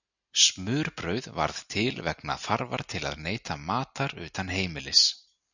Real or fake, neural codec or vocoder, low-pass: real; none; 7.2 kHz